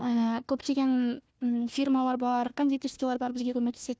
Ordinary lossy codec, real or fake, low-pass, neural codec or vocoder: none; fake; none; codec, 16 kHz, 1 kbps, FunCodec, trained on Chinese and English, 50 frames a second